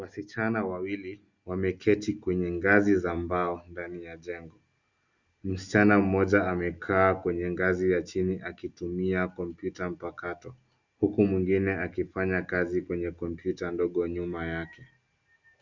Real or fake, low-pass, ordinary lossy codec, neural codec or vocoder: real; 7.2 kHz; Opus, 64 kbps; none